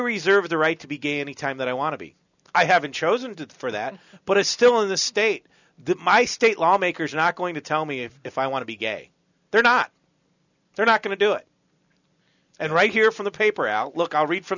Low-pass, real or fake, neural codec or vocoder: 7.2 kHz; real; none